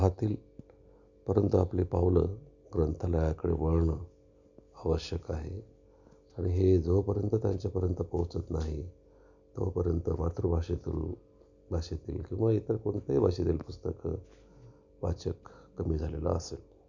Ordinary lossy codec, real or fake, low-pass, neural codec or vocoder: none; real; 7.2 kHz; none